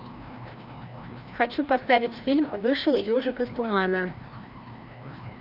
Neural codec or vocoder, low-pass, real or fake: codec, 16 kHz, 1 kbps, FreqCodec, larger model; 5.4 kHz; fake